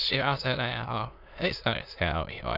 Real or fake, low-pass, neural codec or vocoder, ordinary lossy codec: fake; 5.4 kHz; autoencoder, 22.05 kHz, a latent of 192 numbers a frame, VITS, trained on many speakers; none